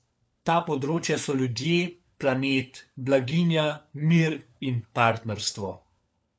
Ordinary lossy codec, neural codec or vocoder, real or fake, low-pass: none; codec, 16 kHz, 4 kbps, FunCodec, trained on LibriTTS, 50 frames a second; fake; none